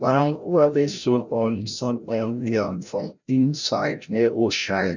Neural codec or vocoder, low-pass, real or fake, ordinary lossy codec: codec, 16 kHz, 0.5 kbps, FreqCodec, larger model; 7.2 kHz; fake; none